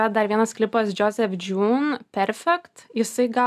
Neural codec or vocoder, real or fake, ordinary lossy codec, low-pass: none; real; AAC, 96 kbps; 14.4 kHz